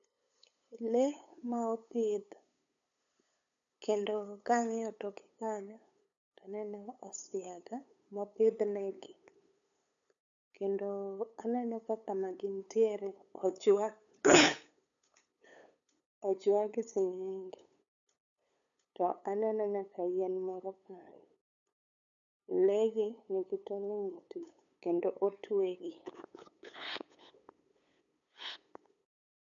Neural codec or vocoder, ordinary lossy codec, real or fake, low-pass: codec, 16 kHz, 8 kbps, FunCodec, trained on LibriTTS, 25 frames a second; none; fake; 7.2 kHz